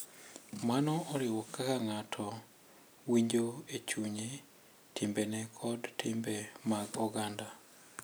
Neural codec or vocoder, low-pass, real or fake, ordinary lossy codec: none; none; real; none